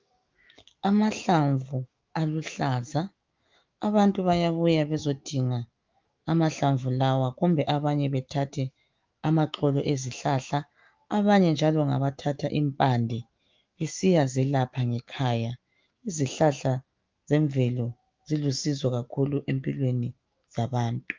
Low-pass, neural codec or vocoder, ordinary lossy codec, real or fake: 7.2 kHz; autoencoder, 48 kHz, 128 numbers a frame, DAC-VAE, trained on Japanese speech; Opus, 32 kbps; fake